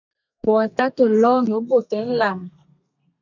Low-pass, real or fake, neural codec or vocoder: 7.2 kHz; fake; codec, 32 kHz, 1.9 kbps, SNAC